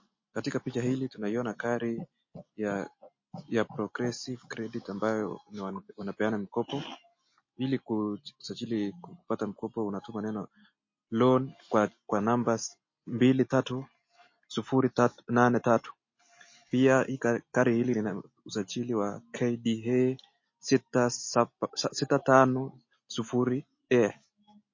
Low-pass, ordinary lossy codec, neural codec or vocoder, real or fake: 7.2 kHz; MP3, 32 kbps; none; real